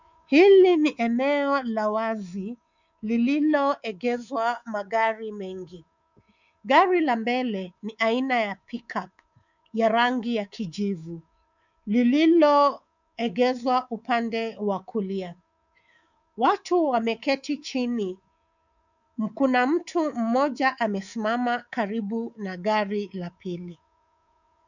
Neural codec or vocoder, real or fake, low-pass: codec, 24 kHz, 3.1 kbps, DualCodec; fake; 7.2 kHz